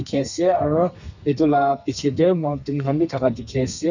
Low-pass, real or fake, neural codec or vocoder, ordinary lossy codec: 7.2 kHz; fake; codec, 32 kHz, 1.9 kbps, SNAC; none